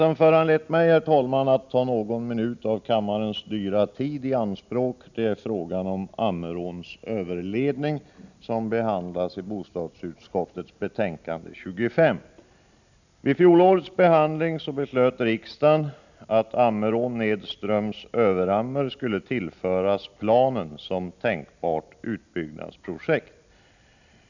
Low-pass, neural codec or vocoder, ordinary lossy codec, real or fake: 7.2 kHz; none; none; real